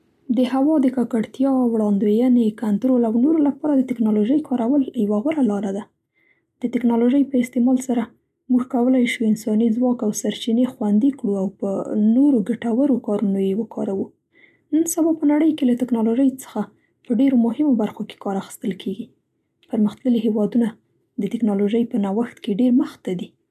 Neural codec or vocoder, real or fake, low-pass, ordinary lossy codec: none; real; 14.4 kHz; none